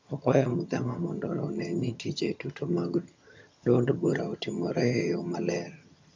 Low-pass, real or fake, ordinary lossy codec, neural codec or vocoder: 7.2 kHz; fake; MP3, 64 kbps; vocoder, 22.05 kHz, 80 mel bands, HiFi-GAN